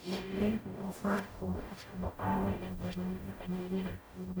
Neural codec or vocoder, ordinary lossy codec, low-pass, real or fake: codec, 44.1 kHz, 0.9 kbps, DAC; none; none; fake